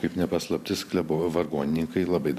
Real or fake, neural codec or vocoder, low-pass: fake; vocoder, 44.1 kHz, 128 mel bands every 512 samples, BigVGAN v2; 14.4 kHz